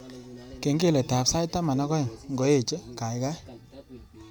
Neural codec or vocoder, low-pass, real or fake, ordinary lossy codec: none; none; real; none